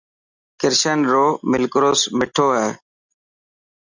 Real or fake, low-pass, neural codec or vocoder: real; 7.2 kHz; none